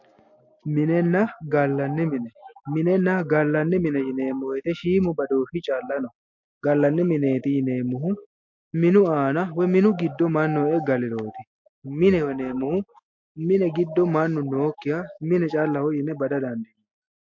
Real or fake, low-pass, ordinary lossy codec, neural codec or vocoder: real; 7.2 kHz; MP3, 48 kbps; none